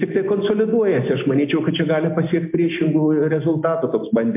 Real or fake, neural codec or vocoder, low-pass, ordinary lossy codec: real; none; 3.6 kHz; MP3, 32 kbps